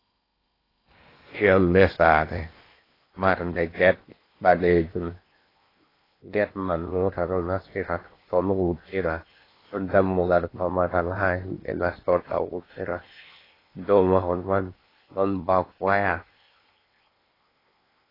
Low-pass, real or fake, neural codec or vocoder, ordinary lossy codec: 5.4 kHz; fake; codec, 16 kHz in and 24 kHz out, 0.8 kbps, FocalCodec, streaming, 65536 codes; AAC, 24 kbps